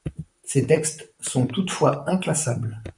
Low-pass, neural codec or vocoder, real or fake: 10.8 kHz; vocoder, 44.1 kHz, 128 mel bands, Pupu-Vocoder; fake